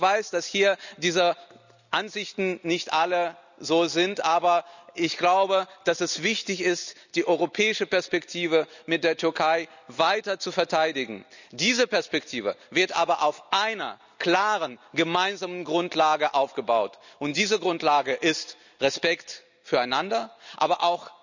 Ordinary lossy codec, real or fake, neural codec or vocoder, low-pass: none; real; none; 7.2 kHz